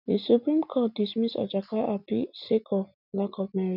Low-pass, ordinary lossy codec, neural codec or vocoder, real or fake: 5.4 kHz; none; none; real